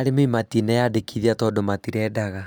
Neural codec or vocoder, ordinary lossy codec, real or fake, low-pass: none; none; real; none